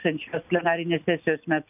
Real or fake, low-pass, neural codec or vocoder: real; 3.6 kHz; none